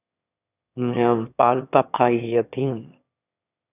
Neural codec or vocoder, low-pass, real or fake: autoencoder, 22.05 kHz, a latent of 192 numbers a frame, VITS, trained on one speaker; 3.6 kHz; fake